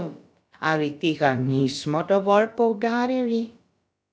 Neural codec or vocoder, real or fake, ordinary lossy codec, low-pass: codec, 16 kHz, about 1 kbps, DyCAST, with the encoder's durations; fake; none; none